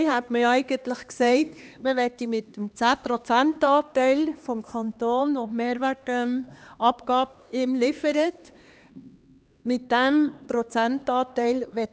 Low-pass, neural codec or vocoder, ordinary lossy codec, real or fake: none; codec, 16 kHz, 2 kbps, X-Codec, HuBERT features, trained on LibriSpeech; none; fake